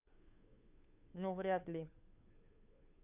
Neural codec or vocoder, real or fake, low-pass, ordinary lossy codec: codec, 16 kHz, 2 kbps, FunCodec, trained on Chinese and English, 25 frames a second; fake; 3.6 kHz; none